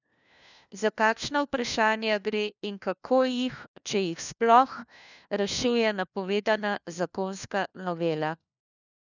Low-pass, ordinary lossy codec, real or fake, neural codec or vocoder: 7.2 kHz; none; fake; codec, 16 kHz, 1 kbps, FunCodec, trained on LibriTTS, 50 frames a second